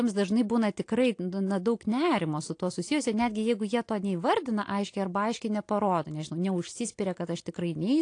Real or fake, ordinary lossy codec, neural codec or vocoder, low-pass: real; AAC, 48 kbps; none; 9.9 kHz